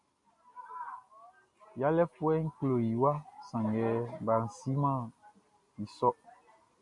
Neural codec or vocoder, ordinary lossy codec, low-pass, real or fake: none; MP3, 48 kbps; 10.8 kHz; real